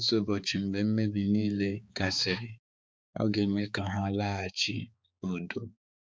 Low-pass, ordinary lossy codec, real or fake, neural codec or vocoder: none; none; fake; codec, 16 kHz, 4 kbps, X-Codec, HuBERT features, trained on balanced general audio